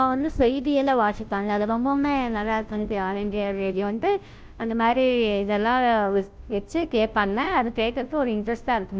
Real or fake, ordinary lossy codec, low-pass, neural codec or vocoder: fake; none; none; codec, 16 kHz, 0.5 kbps, FunCodec, trained on Chinese and English, 25 frames a second